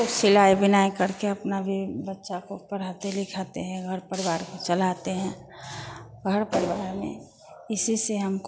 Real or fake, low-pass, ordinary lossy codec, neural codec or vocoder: real; none; none; none